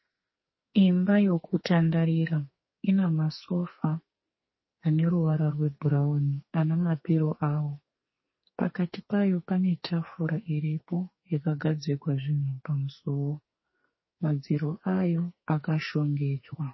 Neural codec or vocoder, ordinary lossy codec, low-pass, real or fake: codec, 44.1 kHz, 2.6 kbps, SNAC; MP3, 24 kbps; 7.2 kHz; fake